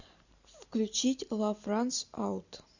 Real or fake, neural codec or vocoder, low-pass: real; none; 7.2 kHz